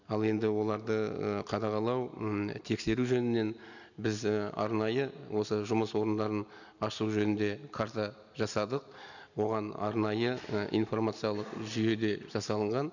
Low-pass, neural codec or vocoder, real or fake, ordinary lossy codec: 7.2 kHz; none; real; none